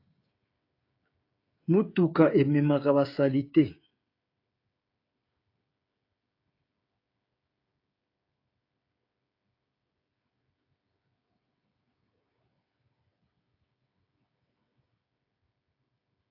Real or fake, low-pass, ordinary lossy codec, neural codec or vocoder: fake; 5.4 kHz; AAC, 32 kbps; codec, 16 kHz, 16 kbps, FreqCodec, smaller model